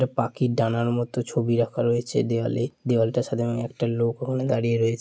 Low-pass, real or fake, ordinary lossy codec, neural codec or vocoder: none; real; none; none